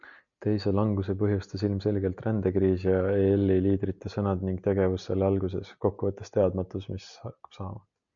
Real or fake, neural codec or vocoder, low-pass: real; none; 7.2 kHz